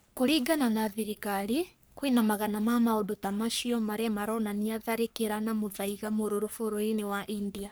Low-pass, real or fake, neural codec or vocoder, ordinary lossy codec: none; fake; codec, 44.1 kHz, 3.4 kbps, Pupu-Codec; none